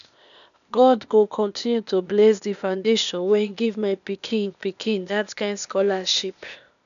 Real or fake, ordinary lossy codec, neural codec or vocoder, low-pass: fake; none; codec, 16 kHz, 0.8 kbps, ZipCodec; 7.2 kHz